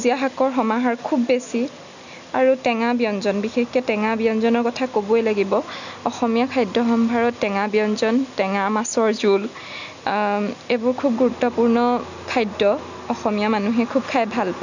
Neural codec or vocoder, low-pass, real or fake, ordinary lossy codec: none; 7.2 kHz; real; none